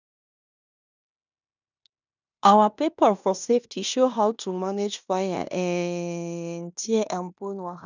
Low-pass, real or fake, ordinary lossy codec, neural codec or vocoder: 7.2 kHz; fake; none; codec, 16 kHz in and 24 kHz out, 0.9 kbps, LongCat-Audio-Codec, fine tuned four codebook decoder